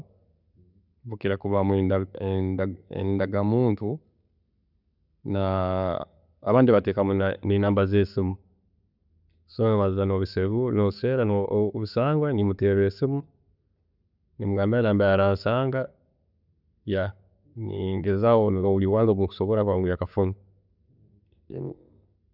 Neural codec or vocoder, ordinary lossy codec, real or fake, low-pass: none; none; real; 5.4 kHz